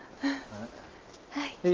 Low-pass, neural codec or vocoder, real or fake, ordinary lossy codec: 7.2 kHz; none; real; Opus, 32 kbps